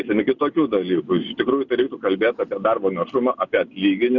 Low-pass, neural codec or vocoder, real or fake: 7.2 kHz; none; real